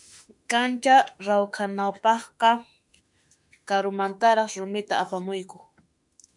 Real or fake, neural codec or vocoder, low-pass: fake; autoencoder, 48 kHz, 32 numbers a frame, DAC-VAE, trained on Japanese speech; 10.8 kHz